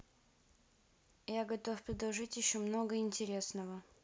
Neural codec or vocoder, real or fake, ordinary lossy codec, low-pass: none; real; none; none